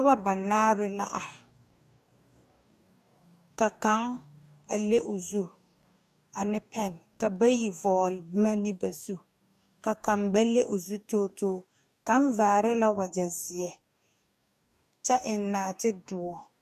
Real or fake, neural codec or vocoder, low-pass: fake; codec, 44.1 kHz, 2.6 kbps, DAC; 14.4 kHz